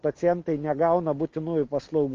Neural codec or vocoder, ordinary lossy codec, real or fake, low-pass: none; Opus, 24 kbps; real; 7.2 kHz